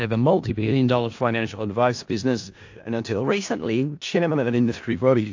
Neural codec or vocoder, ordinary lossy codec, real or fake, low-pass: codec, 16 kHz in and 24 kHz out, 0.4 kbps, LongCat-Audio-Codec, four codebook decoder; AAC, 48 kbps; fake; 7.2 kHz